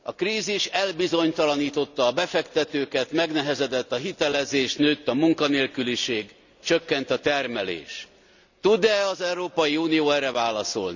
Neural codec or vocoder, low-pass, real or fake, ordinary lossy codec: none; 7.2 kHz; real; none